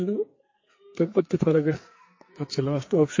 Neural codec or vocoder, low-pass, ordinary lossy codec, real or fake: codec, 44.1 kHz, 2.6 kbps, SNAC; 7.2 kHz; MP3, 32 kbps; fake